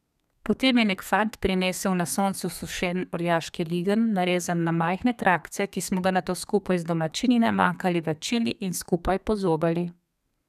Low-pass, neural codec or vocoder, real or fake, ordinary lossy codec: 14.4 kHz; codec, 32 kHz, 1.9 kbps, SNAC; fake; none